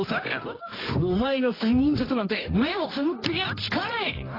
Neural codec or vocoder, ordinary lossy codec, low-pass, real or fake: codec, 24 kHz, 0.9 kbps, WavTokenizer, medium music audio release; AAC, 24 kbps; 5.4 kHz; fake